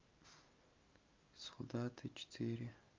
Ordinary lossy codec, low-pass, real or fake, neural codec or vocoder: Opus, 24 kbps; 7.2 kHz; real; none